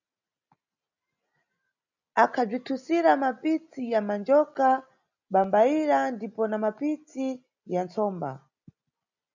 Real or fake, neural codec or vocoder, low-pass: real; none; 7.2 kHz